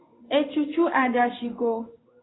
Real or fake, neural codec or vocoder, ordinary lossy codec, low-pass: fake; codec, 16 kHz in and 24 kHz out, 1 kbps, XY-Tokenizer; AAC, 16 kbps; 7.2 kHz